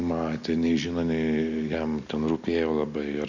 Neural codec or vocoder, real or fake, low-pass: none; real; 7.2 kHz